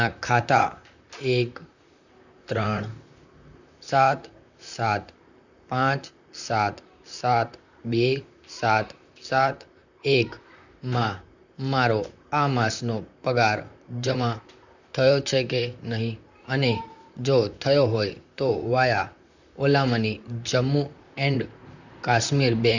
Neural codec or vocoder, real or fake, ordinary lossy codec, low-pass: vocoder, 44.1 kHz, 128 mel bands, Pupu-Vocoder; fake; none; 7.2 kHz